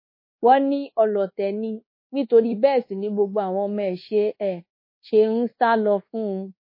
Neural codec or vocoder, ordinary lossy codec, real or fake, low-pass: codec, 24 kHz, 1.2 kbps, DualCodec; MP3, 24 kbps; fake; 5.4 kHz